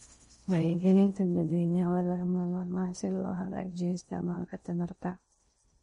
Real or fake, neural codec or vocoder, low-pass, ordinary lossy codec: fake; codec, 16 kHz in and 24 kHz out, 0.6 kbps, FocalCodec, streaming, 4096 codes; 10.8 kHz; MP3, 48 kbps